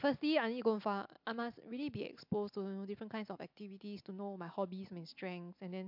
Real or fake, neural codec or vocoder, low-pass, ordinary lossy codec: real; none; 5.4 kHz; MP3, 48 kbps